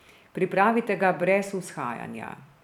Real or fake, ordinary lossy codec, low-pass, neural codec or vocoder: fake; none; 19.8 kHz; vocoder, 44.1 kHz, 128 mel bands every 256 samples, BigVGAN v2